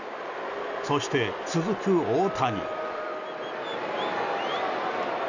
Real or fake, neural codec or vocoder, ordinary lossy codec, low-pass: real; none; none; 7.2 kHz